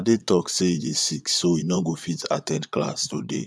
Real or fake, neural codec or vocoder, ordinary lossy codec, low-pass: fake; vocoder, 22.05 kHz, 80 mel bands, Vocos; none; none